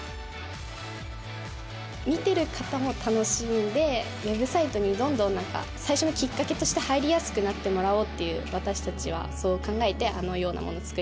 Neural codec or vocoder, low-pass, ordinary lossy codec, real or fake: none; none; none; real